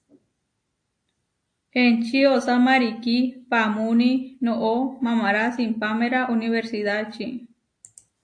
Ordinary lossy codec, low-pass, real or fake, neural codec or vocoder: AAC, 48 kbps; 9.9 kHz; real; none